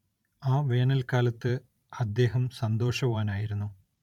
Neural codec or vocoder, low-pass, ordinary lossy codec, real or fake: vocoder, 44.1 kHz, 128 mel bands every 512 samples, BigVGAN v2; 19.8 kHz; none; fake